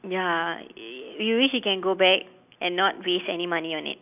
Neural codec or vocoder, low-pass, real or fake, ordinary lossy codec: none; 3.6 kHz; real; none